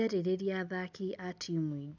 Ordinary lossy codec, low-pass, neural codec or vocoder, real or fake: none; 7.2 kHz; none; real